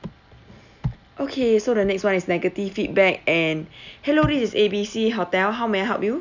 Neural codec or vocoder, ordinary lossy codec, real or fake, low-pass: none; none; real; 7.2 kHz